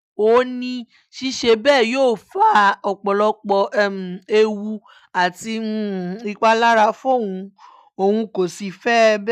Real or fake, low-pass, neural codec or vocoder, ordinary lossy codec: real; 14.4 kHz; none; none